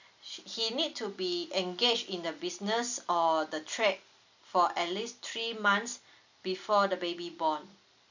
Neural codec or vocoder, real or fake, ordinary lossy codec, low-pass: none; real; none; 7.2 kHz